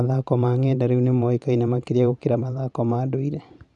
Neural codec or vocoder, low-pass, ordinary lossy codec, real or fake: vocoder, 44.1 kHz, 128 mel bands every 512 samples, BigVGAN v2; 10.8 kHz; none; fake